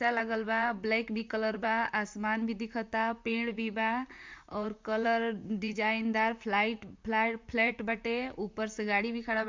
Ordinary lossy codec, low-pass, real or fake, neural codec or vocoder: MP3, 64 kbps; 7.2 kHz; fake; vocoder, 44.1 kHz, 128 mel bands, Pupu-Vocoder